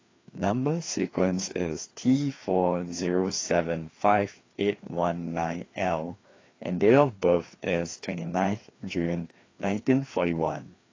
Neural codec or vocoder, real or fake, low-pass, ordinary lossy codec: codec, 16 kHz, 2 kbps, FreqCodec, larger model; fake; 7.2 kHz; AAC, 32 kbps